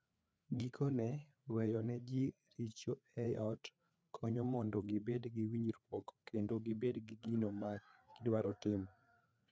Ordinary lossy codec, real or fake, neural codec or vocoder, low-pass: none; fake; codec, 16 kHz, 4 kbps, FreqCodec, larger model; none